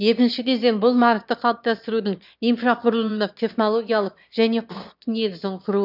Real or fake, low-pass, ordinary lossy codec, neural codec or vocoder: fake; 5.4 kHz; none; autoencoder, 22.05 kHz, a latent of 192 numbers a frame, VITS, trained on one speaker